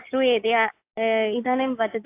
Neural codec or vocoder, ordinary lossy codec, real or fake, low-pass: none; AAC, 24 kbps; real; 3.6 kHz